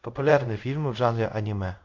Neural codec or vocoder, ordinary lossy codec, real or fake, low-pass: codec, 24 kHz, 0.5 kbps, DualCodec; AAC, 48 kbps; fake; 7.2 kHz